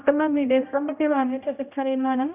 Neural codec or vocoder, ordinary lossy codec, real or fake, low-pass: codec, 16 kHz, 0.5 kbps, X-Codec, HuBERT features, trained on general audio; none; fake; 3.6 kHz